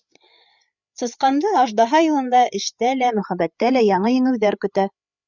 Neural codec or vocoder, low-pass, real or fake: codec, 16 kHz, 8 kbps, FreqCodec, larger model; 7.2 kHz; fake